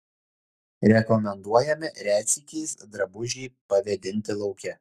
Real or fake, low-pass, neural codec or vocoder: fake; 14.4 kHz; codec, 44.1 kHz, 7.8 kbps, Pupu-Codec